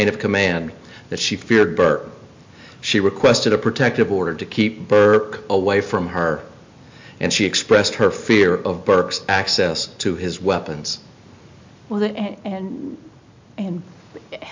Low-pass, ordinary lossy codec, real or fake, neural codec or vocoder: 7.2 kHz; MP3, 48 kbps; real; none